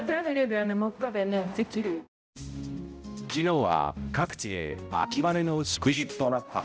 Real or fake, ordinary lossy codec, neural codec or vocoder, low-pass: fake; none; codec, 16 kHz, 0.5 kbps, X-Codec, HuBERT features, trained on balanced general audio; none